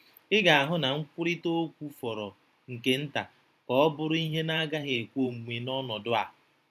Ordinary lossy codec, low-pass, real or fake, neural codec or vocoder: none; 14.4 kHz; fake; vocoder, 44.1 kHz, 128 mel bands every 512 samples, BigVGAN v2